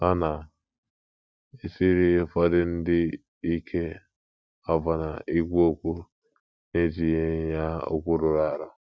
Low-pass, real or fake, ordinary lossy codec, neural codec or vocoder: none; real; none; none